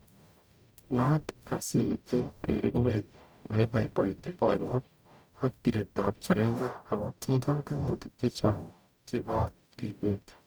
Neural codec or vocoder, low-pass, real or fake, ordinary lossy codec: codec, 44.1 kHz, 0.9 kbps, DAC; none; fake; none